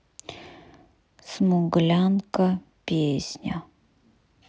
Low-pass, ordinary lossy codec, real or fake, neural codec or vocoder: none; none; real; none